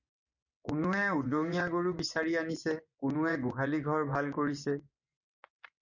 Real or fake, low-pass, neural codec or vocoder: fake; 7.2 kHz; vocoder, 24 kHz, 100 mel bands, Vocos